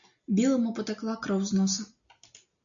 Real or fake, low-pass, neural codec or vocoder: real; 7.2 kHz; none